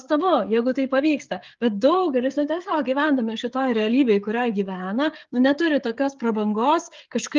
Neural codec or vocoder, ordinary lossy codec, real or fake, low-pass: codec, 16 kHz, 16 kbps, FreqCodec, smaller model; Opus, 32 kbps; fake; 7.2 kHz